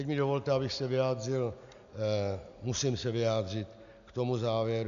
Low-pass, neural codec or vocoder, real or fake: 7.2 kHz; none; real